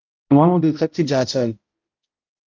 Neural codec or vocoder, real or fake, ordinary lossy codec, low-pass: codec, 16 kHz, 0.5 kbps, X-Codec, HuBERT features, trained on balanced general audio; fake; Opus, 32 kbps; 7.2 kHz